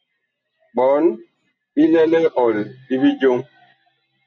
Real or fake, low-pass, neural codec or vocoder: real; 7.2 kHz; none